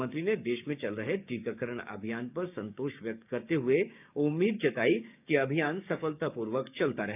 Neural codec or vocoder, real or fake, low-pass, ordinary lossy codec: codec, 16 kHz, 6 kbps, DAC; fake; 3.6 kHz; none